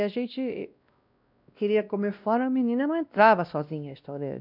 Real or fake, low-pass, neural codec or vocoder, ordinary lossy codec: fake; 5.4 kHz; codec, 16 kHz, 1 kbps, X-Codec, WavLM features, trained on Multilingual LibriSpeech; none